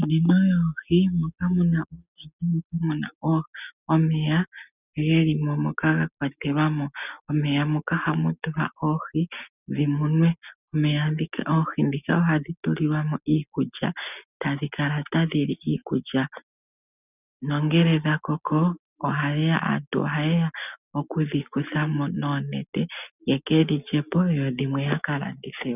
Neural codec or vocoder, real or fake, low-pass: none; real; 3.6 kHz